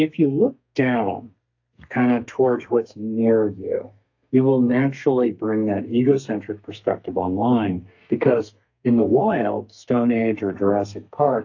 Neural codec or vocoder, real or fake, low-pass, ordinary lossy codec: codec, 32 kHz, 1.9 kbps, SNAC; fake; 7.2 kHz; AAC, 48 kbps